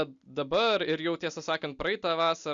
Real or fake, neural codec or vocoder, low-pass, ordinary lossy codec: real; none; 7.2 kHz; Opus, 64 kbps